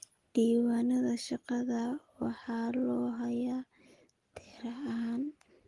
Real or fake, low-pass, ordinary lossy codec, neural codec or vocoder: real; 10.8 kHz; Opus, 24 kbps; none